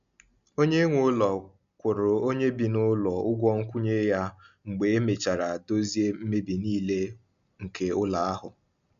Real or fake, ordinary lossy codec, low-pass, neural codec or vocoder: real; none; 7.2 kHz; none